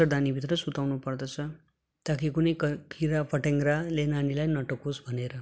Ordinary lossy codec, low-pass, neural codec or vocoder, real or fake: none; none; none; real